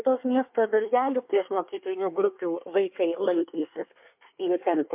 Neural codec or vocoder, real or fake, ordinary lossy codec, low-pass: codec, 24 kHz, 1 kbps, SNAC; fake; MP3, 32 kbps; 3.6 kHz